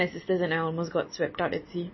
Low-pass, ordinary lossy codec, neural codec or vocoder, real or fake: 7.2 kHz; MP3, 24 kbps; none; real